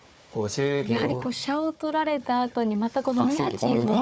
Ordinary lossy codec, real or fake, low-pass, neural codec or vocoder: none; fake; none; codec, 16 kHz, 4 kbps, FunCodec, trained on Chinese and English, 50 frames a second